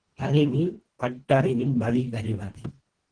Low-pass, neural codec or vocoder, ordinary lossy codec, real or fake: 9.9 kHz; codec, 24 kHz, 1.5 kbps, HILCodec; Opus, 16 kbps; fake